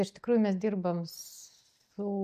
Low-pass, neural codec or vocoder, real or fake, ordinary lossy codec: 14.4 kHz; none; real; MP3, 64 kbps